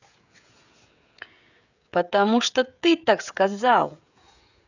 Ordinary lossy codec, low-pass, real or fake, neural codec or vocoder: none; 7.2 kHz; fake; codec, 16 kHz, 16 kbps, FreqCodec, smaller model